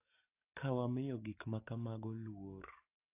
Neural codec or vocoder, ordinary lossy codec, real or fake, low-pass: codec, 44.1 kHz, 7.8 kbps, DAC; AAC, 32 kbps; fake; 3.6 kHz